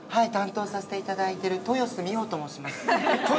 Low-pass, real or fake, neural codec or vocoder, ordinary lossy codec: none; real; none; none